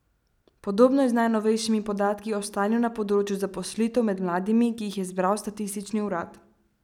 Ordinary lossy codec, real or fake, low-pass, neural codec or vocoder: none; real; 19.8 kHz; none